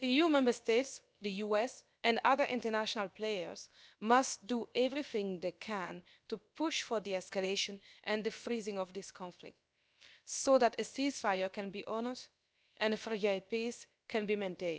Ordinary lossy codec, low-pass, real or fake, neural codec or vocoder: none; none; fake; codec, 16 kHz, about 1 kbps, DyCAST, with the encoder's durations